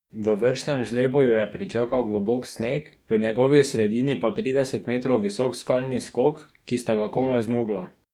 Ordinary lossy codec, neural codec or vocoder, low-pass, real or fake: none; codec, 44.1 kHz, 2.6 kbps, DAC; 19.8 kHz; fake